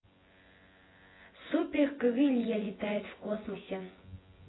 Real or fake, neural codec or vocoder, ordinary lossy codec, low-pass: fake; vocoder, 24 kHz, 100 mel bands, Vocos; AAC, 16 kbps; 7.2 kHz